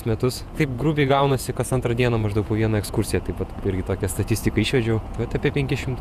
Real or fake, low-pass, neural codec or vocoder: fake; 14.4 kHz; vocoder, 48 kHz, 128 mel bands, Vocos